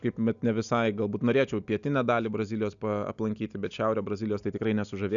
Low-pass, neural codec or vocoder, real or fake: 7.2 kHz; none; real